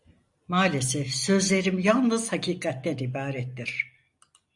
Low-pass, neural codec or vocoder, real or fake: 10.8 kHz; none; real